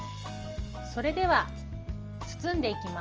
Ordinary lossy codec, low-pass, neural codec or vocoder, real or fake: Opus, 24 kbps; 7.2 kHz; none; real